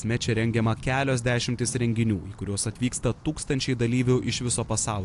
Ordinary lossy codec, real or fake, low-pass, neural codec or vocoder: AAC, 64 kbps; real; 10.8 kHz; none